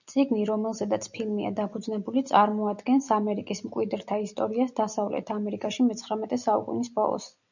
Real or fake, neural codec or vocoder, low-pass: real; none; 7.2 kHz